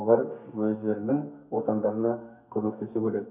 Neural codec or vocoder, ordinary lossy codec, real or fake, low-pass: codec, 32 kHz, 1.9 kbps, SNAC; none; fake; 3.6 kHz